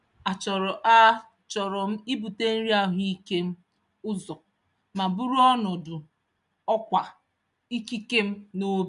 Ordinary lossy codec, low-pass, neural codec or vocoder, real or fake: none; 10.8 kHz; none; real